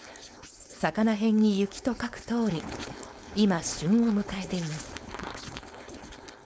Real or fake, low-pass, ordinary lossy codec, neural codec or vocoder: fake; none; none; codec, 16 kHz, 4.8 kbps, FACodec